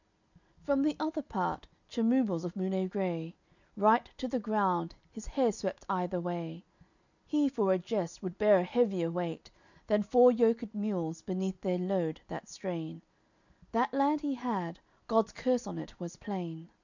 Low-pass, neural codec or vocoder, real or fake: 7.2 kHz; none; real